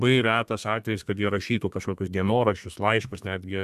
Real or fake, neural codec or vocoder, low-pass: fake; codec, 32 kHz, 1.9 kbps, SNAC; 14.4 kHz